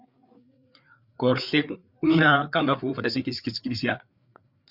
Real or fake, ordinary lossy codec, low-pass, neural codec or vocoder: fake; Opus, 64 kbps; 5.4 kHz; codec, 16 kHz, 4 kbps, FreqCodec, larger model